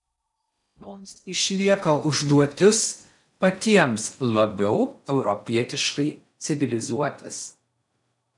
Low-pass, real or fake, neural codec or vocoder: 10.8 kHz; fake; codec, 16 kHz in and 24 kHz out, 0.6 kbps, FocalCodec, streaming, 4096 codes